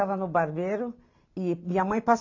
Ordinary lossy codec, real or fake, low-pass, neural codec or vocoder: AAC, 48 kbps; real; 7.2 kHz; none